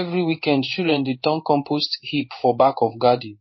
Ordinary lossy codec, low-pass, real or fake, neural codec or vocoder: MP3, 24 kbps; 7.2 kHz; fake; codec, 16 kHz in and 24 kHz out, 1 kbps, XY-Tokenizer